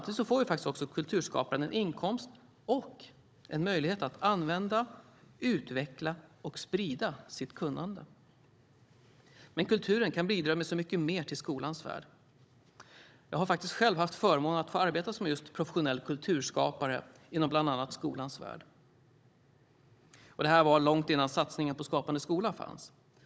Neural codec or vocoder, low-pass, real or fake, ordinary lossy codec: codec, 16 kHz, 16 kbps, FunCodec, trained on Chinese and English, 50 frames a second; none; fake; none